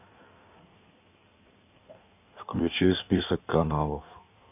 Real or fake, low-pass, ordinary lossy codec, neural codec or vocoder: fake; 3.6 kHz; none; codec, 16 kHz in and 24 kHz out, 1.1 kbps, FireRedTTS-2 codec